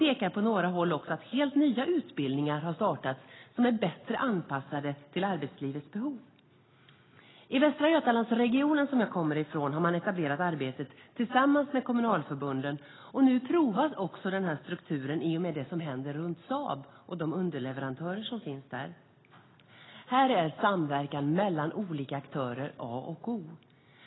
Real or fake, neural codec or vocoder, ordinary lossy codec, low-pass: real; none; AAC, 16 kbps; 7.2 kHz